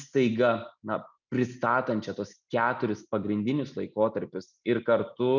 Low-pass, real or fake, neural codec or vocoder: 7.2 kHz; real; none